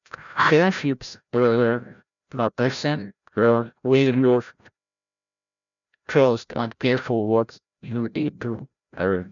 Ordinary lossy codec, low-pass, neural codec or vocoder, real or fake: none; 7.2 kHz; codec, 16 kHz, 0.5 kbps, FreqCodec, larger model; fake